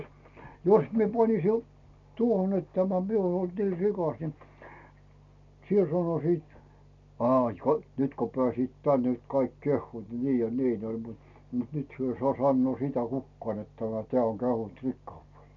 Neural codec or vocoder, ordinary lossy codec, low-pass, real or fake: none; none; 7.2 kHz; real